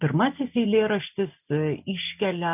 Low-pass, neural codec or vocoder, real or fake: 3.6 kHz; none; real